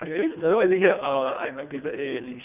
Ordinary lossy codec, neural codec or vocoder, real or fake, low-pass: none; codec, 24 kHz, 1.5 kbps, HILCodec; fake; 3.6 kHz